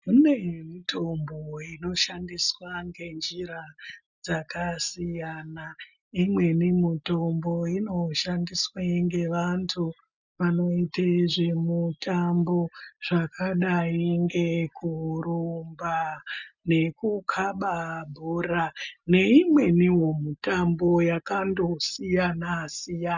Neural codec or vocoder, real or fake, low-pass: none; real; 7.2 kHz